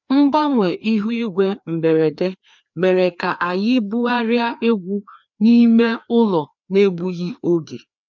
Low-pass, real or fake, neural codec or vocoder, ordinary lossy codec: 7.2 kHz; fake; codec, 16 kHz, 2 kbps, FreqCodec, larger model; none